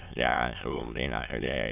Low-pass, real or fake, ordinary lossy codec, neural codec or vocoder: 3.6 kHz; fake; none; autoencoder, 22.05 kHz, a latent of 192 numbers a frame, VITS, trained on many speakers